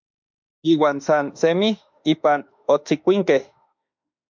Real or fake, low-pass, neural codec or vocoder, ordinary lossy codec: fake; 7.2 kHz; autoencoder, 48 kHz, 32 numbers a frame, DAC-VAE, trained on Japanese speech; MP3, 64 kbps